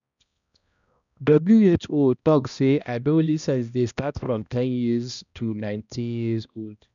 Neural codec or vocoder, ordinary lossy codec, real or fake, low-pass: codec, 16 kHz, 1 kbps, X-Codec, HuBERT features, trained on balanced general audio; none; fake; 7.2 kHz